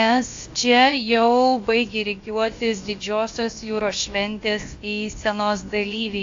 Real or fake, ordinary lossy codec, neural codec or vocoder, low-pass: fake; AAC, 48 kbps; codec, 16 kHz, about 1 kbps, DyCAST, with the encoder's durations; 7.2 kHz